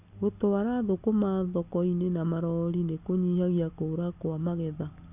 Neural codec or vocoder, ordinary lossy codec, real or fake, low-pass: none; none; real; 3.6 kHz